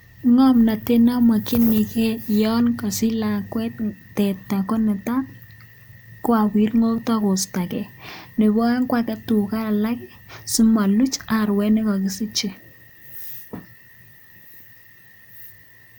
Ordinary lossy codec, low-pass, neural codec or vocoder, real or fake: none; none; none; real